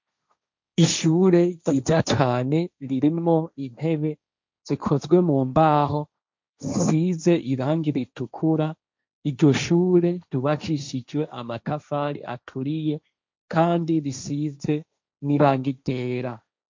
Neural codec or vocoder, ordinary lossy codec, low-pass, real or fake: codec, 16 kHz, 1.1 kbps, Voila-Tokenizer; MP3, 64 kbps; 7.2 kHz; fake